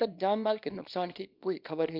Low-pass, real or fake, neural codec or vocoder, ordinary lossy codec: 5.4 kHz; fake; codec, 24 kHz, 0.9 kbps, WavTokenizer, small release; none